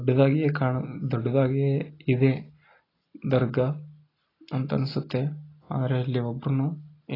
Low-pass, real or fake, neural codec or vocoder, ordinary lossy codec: 5.4 kHz; real; none; AAC, 24 kbps